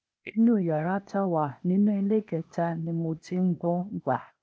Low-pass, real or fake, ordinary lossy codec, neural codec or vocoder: none; fake; none; codec, 16 kHz, 0.8 kbps, ZipCodec